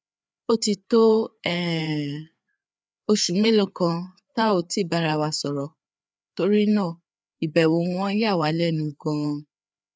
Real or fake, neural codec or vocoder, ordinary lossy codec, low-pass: fake; codec, 16 kHz, 4 kbps, FreqCodec, larger model; none; none